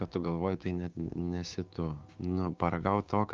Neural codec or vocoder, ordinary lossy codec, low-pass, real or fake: codec, 16 kHz, 6 kbps, DAC; Opus, 24 kbps; 7.2 kHz; fake